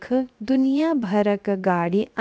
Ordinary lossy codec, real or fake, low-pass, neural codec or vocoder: none; fake; none; codec, 16 kHz, 0.7 kbps, FocalCodec